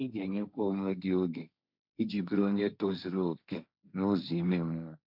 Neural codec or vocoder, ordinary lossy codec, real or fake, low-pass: codec, 16 kHz, 1.1 kbps, Voila-Tokenizer; none; fake; 5.4 kHz